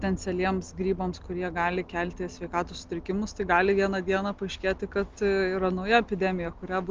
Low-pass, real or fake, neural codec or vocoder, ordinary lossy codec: 7.2 kHz; real; none; Opus, 32 kbps